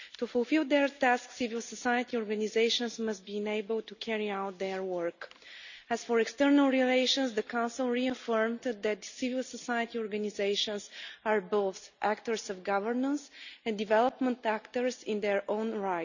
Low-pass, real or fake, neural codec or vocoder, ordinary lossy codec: 7.2 kHz; real; none; none